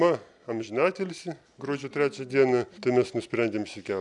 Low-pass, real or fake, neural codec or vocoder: 10.8 kHz; real; none